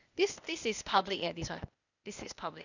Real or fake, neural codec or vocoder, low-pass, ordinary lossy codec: fake; codec, 16 kHz, 0.8 kbps, ZipCodec; 7.2 kHz; none